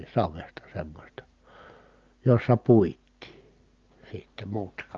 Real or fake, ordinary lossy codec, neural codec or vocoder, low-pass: real; Opus, 24 kbps; none; 7.2 kHz